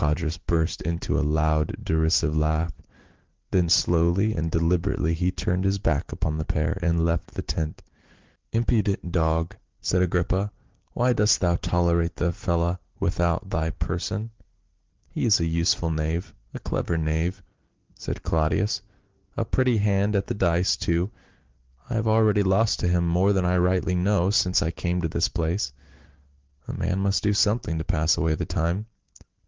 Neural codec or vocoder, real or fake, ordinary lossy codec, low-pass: none; real; Opus, 16 kbps; 7.2 kHz